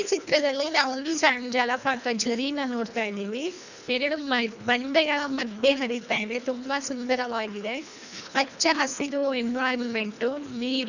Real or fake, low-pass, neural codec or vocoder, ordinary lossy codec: fake; 7.2 kHz; codec, 24 kHz, 1.5 kbps, HILCodec; none